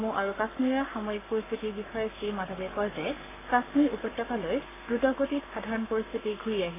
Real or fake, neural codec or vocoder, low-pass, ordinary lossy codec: fake; codec, 44.1 kHz, 7.8 kbps, Pupu-Codec; 3.6 kHz; AAC, 16 kbps